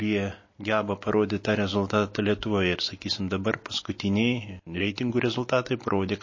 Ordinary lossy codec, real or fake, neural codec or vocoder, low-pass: MP3, 32 kbps; real; none; 7.2 kHz